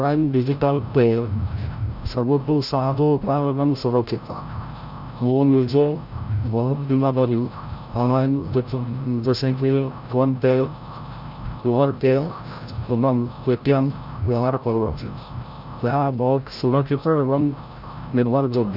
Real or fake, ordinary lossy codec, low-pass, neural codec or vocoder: fake; Opus, 64 kbps; 5.4 kHz; codec, 16 kHz, 0.5 kbps, FreqCodec, larger model